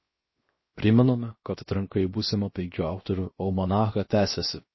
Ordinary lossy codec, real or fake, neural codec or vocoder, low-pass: MP3, 24 kbps; fake; codec, 16 kHz, 0.7 kbps, FocalCodec; 7.2 kHz